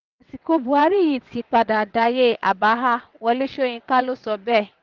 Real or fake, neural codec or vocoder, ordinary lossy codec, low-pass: fake; vocoder, 22.05 kHz, 80 mel bands, WaveNeXt; Opus, 32 kbps; 7.2 kHz